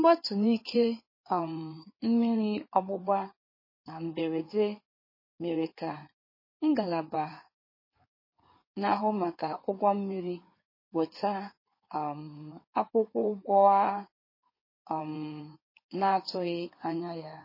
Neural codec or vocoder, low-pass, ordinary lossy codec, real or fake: codec, 16 kHz in and 24 kHz out, 2.2 kbps, FireRedTTS-2 codec; 5.4 kHz; MP3, 24 kbps; fake